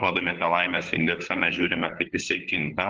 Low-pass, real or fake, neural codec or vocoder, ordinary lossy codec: 7.2 kHz; fake; codec, 16 kHz, 4 kbps, FunCodec, trained on LibriTTS, 50 frames a second; Opus, 24 kbps